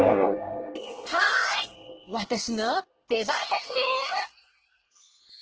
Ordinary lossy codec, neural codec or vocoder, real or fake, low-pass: Opus, 16 kbps; codec, 24 kHz, 1 kbps, SNAC; fake; 7.2 kHz